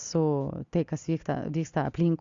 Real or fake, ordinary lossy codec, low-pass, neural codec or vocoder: real; Opus, 64 kbps; 7.2 kHz; none